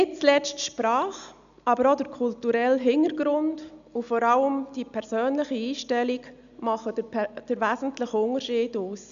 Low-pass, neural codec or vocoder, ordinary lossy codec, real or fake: 7.2 kHz; none; none; real